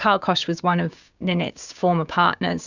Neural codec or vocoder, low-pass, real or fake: codec, 16 kHz, 6 kbps, DAC; 7.2 kHz; fake